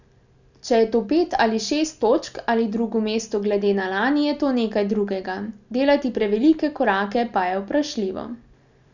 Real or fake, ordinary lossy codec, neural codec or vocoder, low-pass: real; none; none; 7.2 kHz